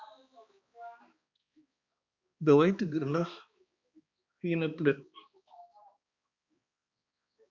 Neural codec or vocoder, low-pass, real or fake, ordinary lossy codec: codec, 16 kHz, 2 kbps, X-Codec, HuBERT features, trained on balanced general audio; 7.2 kHz; fake; Opus, 64 kbps